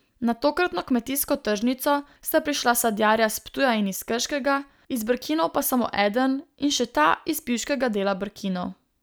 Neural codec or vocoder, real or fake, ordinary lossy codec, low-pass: none; real; none; none